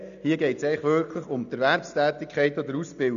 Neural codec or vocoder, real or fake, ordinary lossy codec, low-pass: none; real; MP3, 96 kbps; 7.2 kHz